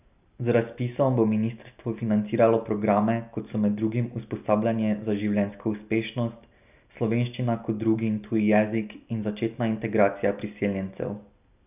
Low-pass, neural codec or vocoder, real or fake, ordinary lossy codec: 3.6 kHz; none; real; none